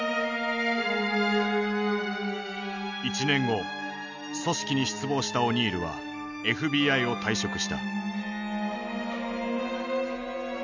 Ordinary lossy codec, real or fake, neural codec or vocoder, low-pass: none; real; none; 7.2 kHz